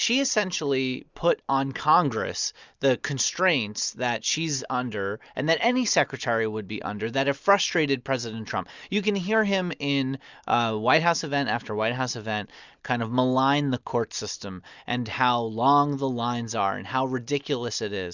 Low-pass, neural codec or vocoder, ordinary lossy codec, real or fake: 7.2 kHz; none; Opus, 64 kbps; real